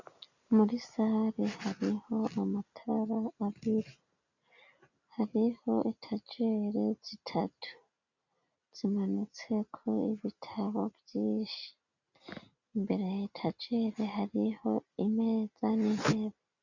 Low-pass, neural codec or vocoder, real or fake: 7.2 kHz; none; real